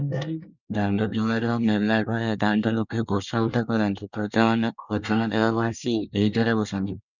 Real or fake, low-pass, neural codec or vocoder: fake; 7.2 kHz; codec, 24 kHz, 1 kbps, SNAC